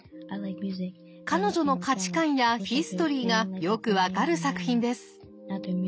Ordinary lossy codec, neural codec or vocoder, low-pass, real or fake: none; none; none; real